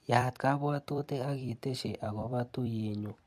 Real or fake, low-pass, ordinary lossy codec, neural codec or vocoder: fake; 14.4 kHz; MP3, 64 kbps; vocoder, 44.1 kHz, 128 mel bands every 256 samples, BigVGAN v2